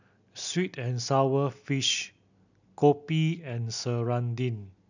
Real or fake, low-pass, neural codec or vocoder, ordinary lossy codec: real; 7.2 kHz; none; none